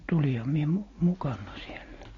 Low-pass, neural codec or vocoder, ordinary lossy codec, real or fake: 7.2 kHz; none; AAC, 32 kbps; real